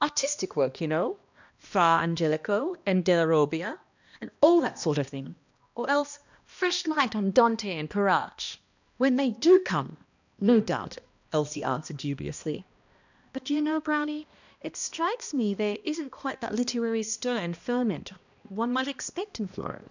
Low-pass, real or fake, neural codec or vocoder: 7.2 kHz; fake; codec, 16 kHz, 1 kbps, X-Codec, HuBERT features, trained on balanced general audio